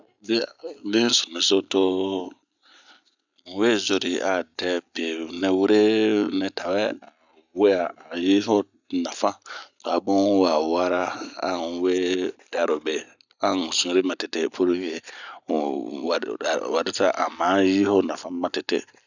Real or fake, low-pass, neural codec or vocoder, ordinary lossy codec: real; 7.2 kHz; none; none